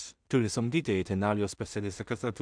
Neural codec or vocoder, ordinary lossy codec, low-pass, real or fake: codec, 16 kHz in and 24 kHz out, 0.4 kbps, LongCat-Audio-Codec, two codebook decoder; MP3, 96 kbps; 9.9 kHz; fake